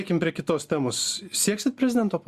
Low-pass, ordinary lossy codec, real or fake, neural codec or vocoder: 14.4 kHz; Opus, 64 kbps; real; none